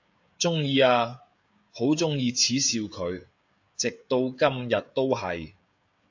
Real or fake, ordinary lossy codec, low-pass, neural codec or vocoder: fake; AAC, 48 kbps; 7.2 kHz; codec, 16 kHz, 16 kbps, FreqCodec, smaller model